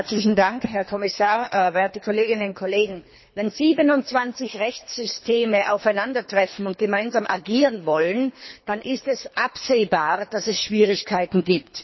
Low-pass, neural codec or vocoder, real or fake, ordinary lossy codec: 7.2 kHz; codec, 24 kHz, 3 kbps, HILCodec; fake; MP3, 24 kbps